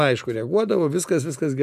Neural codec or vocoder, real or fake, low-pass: none; real; 14.4 kHz